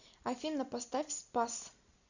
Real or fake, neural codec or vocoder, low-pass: real; none; 7.2 kHz